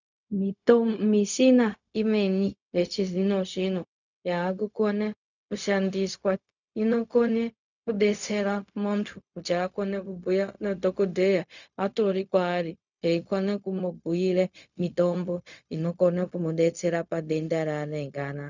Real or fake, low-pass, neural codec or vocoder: fake; 7.2 kHz; codec, 16 kHz, 0.4 kbps, LongCat-Audio-Codec